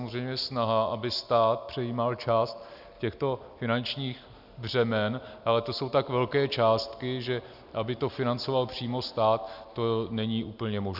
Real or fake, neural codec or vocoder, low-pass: real; none; 5.4 kHz